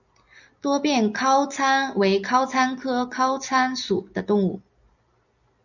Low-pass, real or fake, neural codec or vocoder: 7.2 kHz; real; none